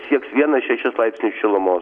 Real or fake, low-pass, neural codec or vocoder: real; 9.9 kHz; none